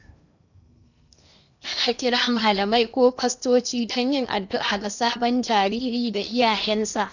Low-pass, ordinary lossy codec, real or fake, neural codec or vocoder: 7.2 kHz; none; fake; codec, 16 kHz in and 24 kHz out, 0.8 kbps, FocalCodec, streaming, 65536 codes